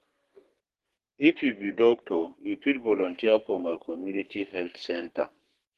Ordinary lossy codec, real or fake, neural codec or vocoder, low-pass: Opus, 16 kbps; fake; codec, 32 kHz, 1.9 kbps, SNAC; 14.4 kHz